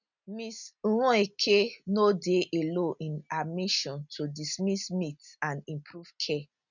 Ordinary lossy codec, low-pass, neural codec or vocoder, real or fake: none; 7.2 kHz; none; real